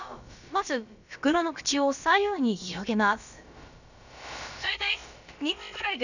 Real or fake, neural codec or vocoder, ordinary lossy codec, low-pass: fake; codec, 16 kHz, about 1 kbps, DyCAST, with the encoder's durations; none; 7.2 kHz